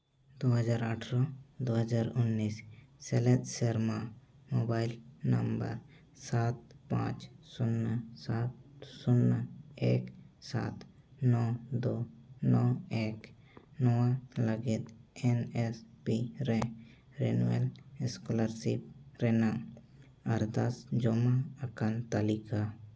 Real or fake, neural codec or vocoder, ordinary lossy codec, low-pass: real; none; none; none